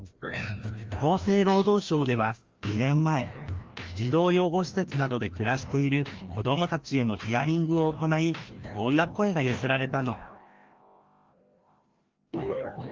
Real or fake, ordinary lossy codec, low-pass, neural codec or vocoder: fake; Opus, 32 kbps; 7.2 kHz; codec, 16 kHz, 1 kbps, FreqCodec, larger model